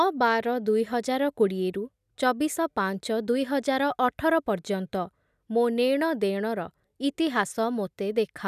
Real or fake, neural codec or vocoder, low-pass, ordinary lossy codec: real; none; 14.4 kHz; none